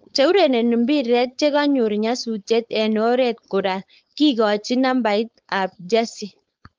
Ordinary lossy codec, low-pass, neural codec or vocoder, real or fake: Opus, 24 kbps; 7.2 kHz; codec, 16 kHz, 4.8 kbps, FACodec; fake